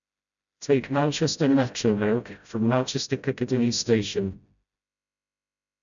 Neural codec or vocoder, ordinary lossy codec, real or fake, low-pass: codec, 16 kHz, 0.5 kbps, FreqCodec, smaller model; none; fake; 7.2 kHz